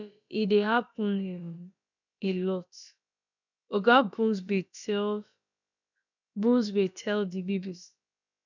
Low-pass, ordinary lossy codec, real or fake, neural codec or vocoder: 7.2 kHz; none; fake; codec, 16 kHz, about 1 kbps, DyCAST, with the encoder's durations